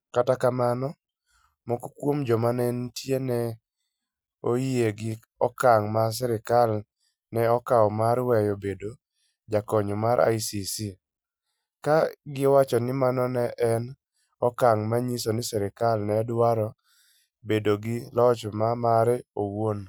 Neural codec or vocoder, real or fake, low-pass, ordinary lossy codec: none; real; none; none